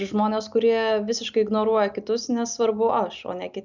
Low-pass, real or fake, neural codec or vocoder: 7.2 kHz; real; none